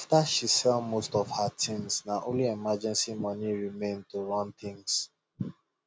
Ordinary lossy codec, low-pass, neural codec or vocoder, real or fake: none; none; none; real